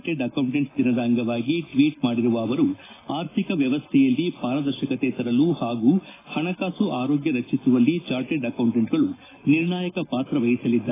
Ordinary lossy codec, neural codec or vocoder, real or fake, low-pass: AAC, 16 kbps; none; real; 3.6 kHz